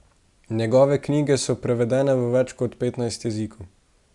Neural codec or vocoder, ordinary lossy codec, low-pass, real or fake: none; Opus, 64 kbps; 10.8 kHz; real